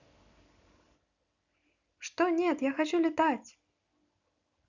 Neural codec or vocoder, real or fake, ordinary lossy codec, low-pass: none; real; none; 7.2 kHz